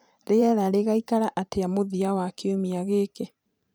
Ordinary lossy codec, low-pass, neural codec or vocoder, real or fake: none; none; vocoder, 44.1 kHz, 128 mel bands every 512 samples, BigVGAN v2; fake